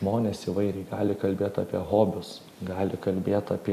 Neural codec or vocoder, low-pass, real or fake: none; 14.4 kHz; real